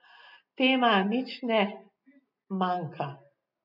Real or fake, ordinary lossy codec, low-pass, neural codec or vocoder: real; none; 5.4 kHz; none